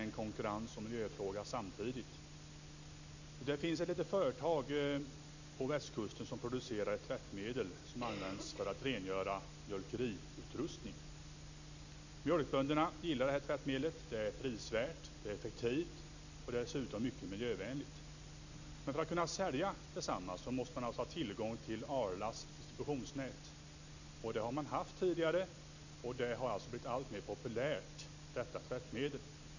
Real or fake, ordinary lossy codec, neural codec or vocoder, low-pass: real; none; none; 7.2 kHz